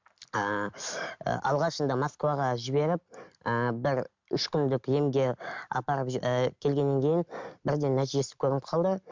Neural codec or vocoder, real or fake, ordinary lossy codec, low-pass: none; real; MP3, 64 kbps; 7.2 kHz